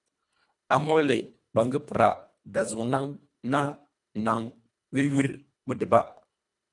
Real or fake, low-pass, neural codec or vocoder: fake; 10.8 kHz; codec, 24 kHz, 1.5 kbps, HILCodec